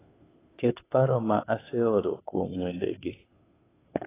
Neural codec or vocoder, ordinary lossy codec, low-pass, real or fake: codec, 16 kHz, 2 kbps, FunCodec, trained on Chinese and English, 25 frames a second; AAC, 16 kbps; 3.6 kHz; fake